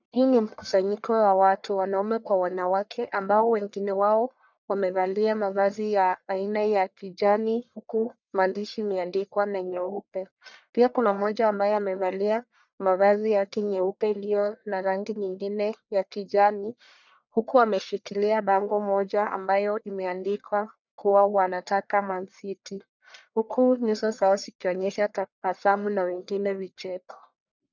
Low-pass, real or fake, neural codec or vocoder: 7.2 kHz; fake; codec, 44.1 kHz, 1.7 kbps, Pupu-Codec